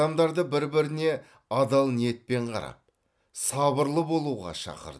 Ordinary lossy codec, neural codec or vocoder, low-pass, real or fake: none; none; none; real